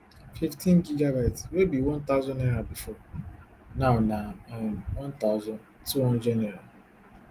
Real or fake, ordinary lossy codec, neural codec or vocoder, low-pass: real; Opus, 24 kbps; none; 14.4 kHz